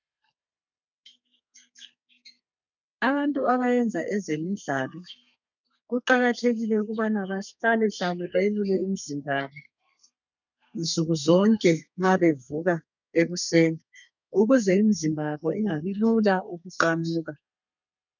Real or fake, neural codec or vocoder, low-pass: fake; codec, 32 kHz, 1.9 kbps, SNAC; 7.2 kHz